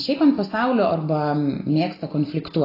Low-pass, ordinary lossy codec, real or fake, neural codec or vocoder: 5.4 kHz; AAC, 24 kbps; real; none